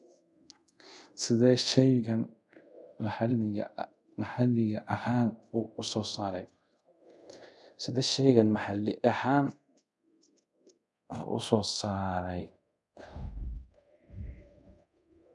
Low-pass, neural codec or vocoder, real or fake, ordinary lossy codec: 10.8 kHz; codec, 24 kHz, 0.5 kbps, DualCodec; fake; none